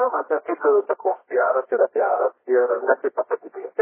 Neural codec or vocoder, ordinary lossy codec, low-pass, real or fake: codec, 24 kHz, 0.9 kbps, WavTokenizer, medium music audio release; MP3, 16 kbps; 3.6 kHz; fake